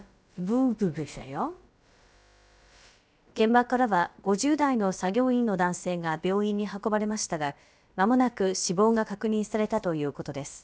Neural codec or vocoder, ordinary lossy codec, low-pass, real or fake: codec, 16 kHz, about 1 kbps, DyCAST, with the encoder's durations; none; none; fake